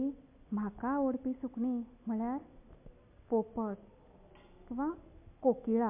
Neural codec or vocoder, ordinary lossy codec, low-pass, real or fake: none; MP3, 24 kbps; 3.6 kHz; real